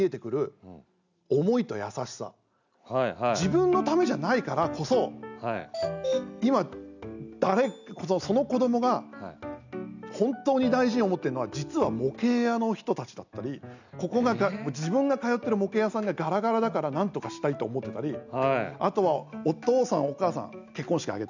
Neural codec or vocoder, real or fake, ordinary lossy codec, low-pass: none; real; none; 7.2 kHz